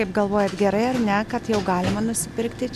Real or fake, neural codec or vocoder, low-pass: real; none; 14.4 kHz